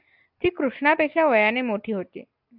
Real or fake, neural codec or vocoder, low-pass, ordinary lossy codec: real; none; 5.4 kHz; AAC, 48 kbps